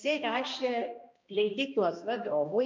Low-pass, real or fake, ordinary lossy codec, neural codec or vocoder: 7.2 kHz; fake; MP3, 64 kbps; codec, 16 kHz, 1 kbps, X-Codec, HuBERT features, trained on balanced general audio